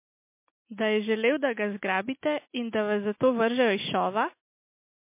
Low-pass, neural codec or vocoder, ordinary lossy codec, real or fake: 3.6 kHz; none; MP3, 24 kbps; real